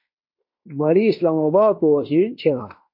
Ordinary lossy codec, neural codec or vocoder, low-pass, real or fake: MP3, 32 kbps; codec, 16 kHz in and 24 kHz out, 0.9 kbps, LongCat-Audio-Codec, fine tuned four codebook decoder; 5.4 kHz; fake